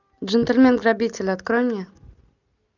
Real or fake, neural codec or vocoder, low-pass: real; none; 7.2 kHz